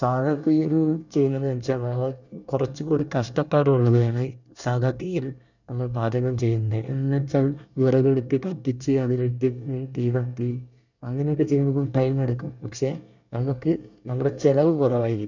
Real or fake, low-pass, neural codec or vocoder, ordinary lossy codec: fake; 7.2 kHz; codec, 24 kHz, 1 kbps, SNAC; none